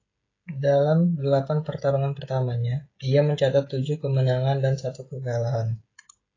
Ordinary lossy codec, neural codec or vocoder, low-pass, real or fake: AAC, 32 kbps; codec, 16 kHz, 16 kbps, FreqCodec, smaller model; 7.2 kHz; fake